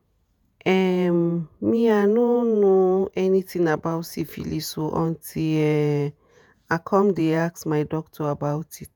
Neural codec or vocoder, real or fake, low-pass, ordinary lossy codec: vocoder, 48 kHz, 128 mel bands, Vocos; fake; none; none